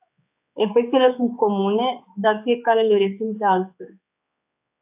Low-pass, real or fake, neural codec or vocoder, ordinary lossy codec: 3.6 kHz; fake; codec, 16 kHz, 4 kbps, X-Codec, HuBERT features, trained on general audio; AAC, 32 kbps